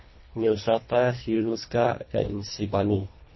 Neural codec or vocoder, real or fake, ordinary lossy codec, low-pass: codec, 24 kHz, 1.5 kbps, HILCodec; fake; MP3, 24 kbps; 7.2 kHz